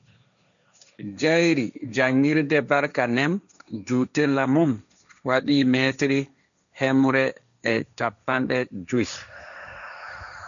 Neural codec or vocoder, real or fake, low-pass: codec, 16 kHz, 1.1 kbps, Voila-Tokenizer; fake; 7.2 kHz